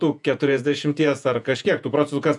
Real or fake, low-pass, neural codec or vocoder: fake; 10.8 kHz; vocoder, 48 kHz, 128 mel bands, Vocos